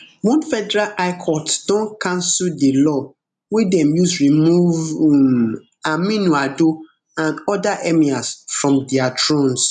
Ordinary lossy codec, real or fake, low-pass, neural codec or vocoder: none; real; 10.8 kHz; none